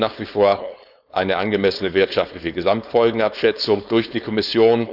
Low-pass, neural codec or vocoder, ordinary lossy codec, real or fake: 5.4 kHz; codec, 16 kHz, 4.8 kbps, FACodec; none; fake